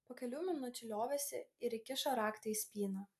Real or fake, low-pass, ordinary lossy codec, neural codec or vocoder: real; 14.4 kHz; AAC, 96 kbps; none